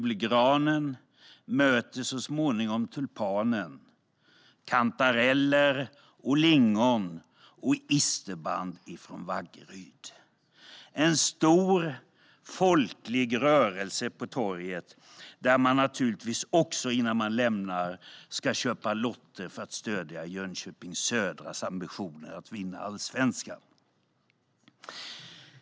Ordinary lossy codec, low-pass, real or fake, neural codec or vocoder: none; none; real; none